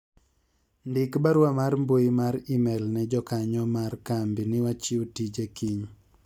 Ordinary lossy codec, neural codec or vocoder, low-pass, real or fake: none; none; 19.8 kHz; real